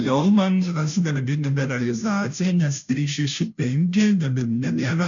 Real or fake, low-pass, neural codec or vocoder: fake; 7.2 kHz; codec, 16 kHz, 0.5 kbps, FunCodec, trained on Chinese and English, 25 frames a second